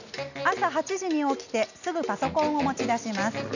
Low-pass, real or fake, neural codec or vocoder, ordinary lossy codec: 7.2 kHz; real; none; none